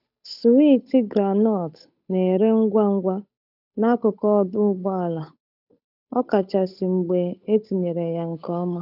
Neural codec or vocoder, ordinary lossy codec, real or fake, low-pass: codec, 16 kHz, 8 kbps, FunCodec, trained on Chinese and English, 25 frames a second; none; fake; 5.4 kHz